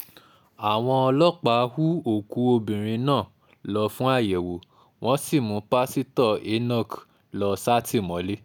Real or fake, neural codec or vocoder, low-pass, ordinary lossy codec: real; none; none; none